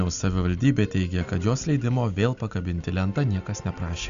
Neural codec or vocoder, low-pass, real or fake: none; 7.2 kHz; real